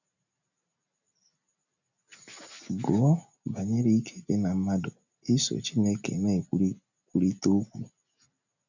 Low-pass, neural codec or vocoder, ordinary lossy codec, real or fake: 7.2 kHz; none; none; real